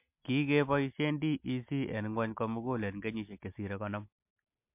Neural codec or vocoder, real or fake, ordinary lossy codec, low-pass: none; real; MP3, 32 kbps; 3.6 kHz